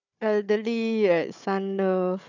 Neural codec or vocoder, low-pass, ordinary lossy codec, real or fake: codec, 16 kHz, 4 kbps, FunCodec, trained on Chinese and English, 50 frames a second; 7.2 kHz; none; fake